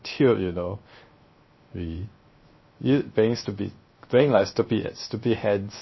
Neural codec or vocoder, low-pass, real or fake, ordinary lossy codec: codec, 16 kHz, 0.3 kbps, FocalCodec; 7.2 kHz; fake; MP3, 24 kbps